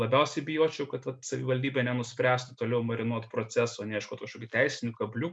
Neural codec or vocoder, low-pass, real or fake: none; 9.9 kHz; real